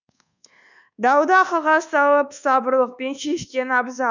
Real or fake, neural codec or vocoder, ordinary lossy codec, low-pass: fake; codec, 24 kHz, 1.2 kbps, DualCodec; none; 7.2 kHz